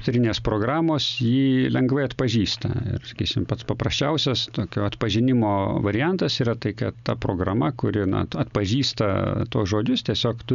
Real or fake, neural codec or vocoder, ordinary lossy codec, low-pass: real; none; MP3, 96 kbps; 7.2 kHz